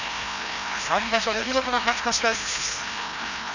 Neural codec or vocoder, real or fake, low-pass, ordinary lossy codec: codec, 16 kHz, 1 kbps, FreqCodec, larger model; fake; 7.2 kHz; none